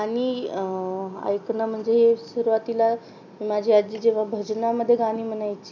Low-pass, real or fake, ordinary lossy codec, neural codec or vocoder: 7.2 kHz; real; none; none